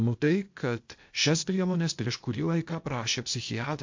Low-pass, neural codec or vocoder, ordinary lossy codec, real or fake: 7.2 kHz; codec, 16 kHz, 0.8 kbps, ZipCodec; MP3, 48 kbps; fake